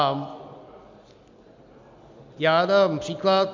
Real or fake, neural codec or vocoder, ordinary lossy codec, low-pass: real; none; MP3, 64 kbps; 7.2 kHz